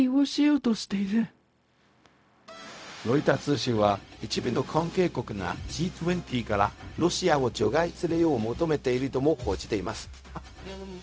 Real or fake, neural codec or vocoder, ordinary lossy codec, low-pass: fake; codec, 16 kHz, 0.4 kbps, LongCat-Audio-Codec; none; none